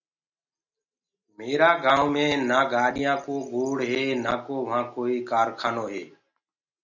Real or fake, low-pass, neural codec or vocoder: real; 7.2 kHz; none